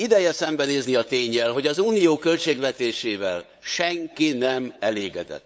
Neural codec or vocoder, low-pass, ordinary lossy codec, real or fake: codec, 16 kHz, 8 kbps, FunCodec, trained on LibriTTS, 25 frames a second; none; none; fake